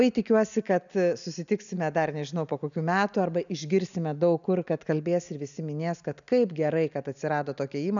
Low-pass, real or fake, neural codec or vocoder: 7.2 kHz; real; none